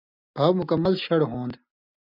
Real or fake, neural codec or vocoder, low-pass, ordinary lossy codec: real; none; 5.4 kHz; AAC, 48 kbps